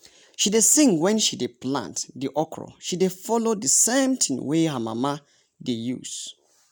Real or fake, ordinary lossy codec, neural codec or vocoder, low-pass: real; none; none; none